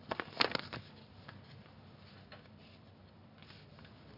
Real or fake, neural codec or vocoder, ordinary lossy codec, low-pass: real; none; none; 5.4 kHz